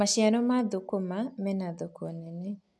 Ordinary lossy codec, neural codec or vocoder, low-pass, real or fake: none; none; none; real